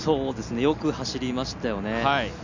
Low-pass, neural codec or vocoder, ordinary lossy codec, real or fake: 7.2 kHz; none; none; real